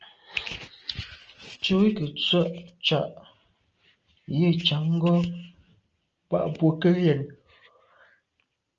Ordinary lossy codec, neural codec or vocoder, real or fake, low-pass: Opus, 24 kbps; none; real; 7.2 kHz